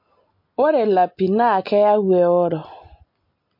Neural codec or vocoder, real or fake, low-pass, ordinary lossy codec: none; real; 5.4 kHz; MP3, 32 kbps